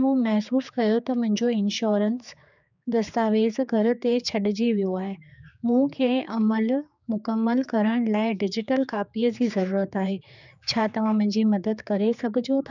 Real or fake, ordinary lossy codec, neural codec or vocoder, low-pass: fake; none; codec, 16 kHz, 4 kbps, X-Codec, HuBERT features, trained on general audio; 7.2 kHz